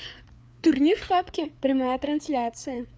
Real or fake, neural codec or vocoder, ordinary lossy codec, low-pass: fake; codec, 16 kHz, 4 kbps, FreqCodec, larger model; none; none